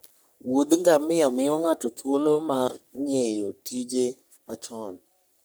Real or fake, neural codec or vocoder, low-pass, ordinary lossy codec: fake; codec, 44.1 kHz, 3.4 kbps, Pupu-Codec; none; none